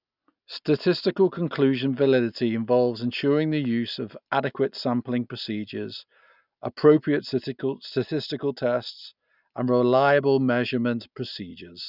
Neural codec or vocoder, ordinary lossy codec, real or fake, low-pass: none; none; real; 5.4 kHz